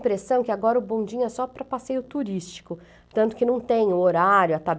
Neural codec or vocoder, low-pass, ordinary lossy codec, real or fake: none; none; none; real